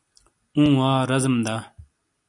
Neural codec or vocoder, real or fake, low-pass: none; real; 10.8 kHz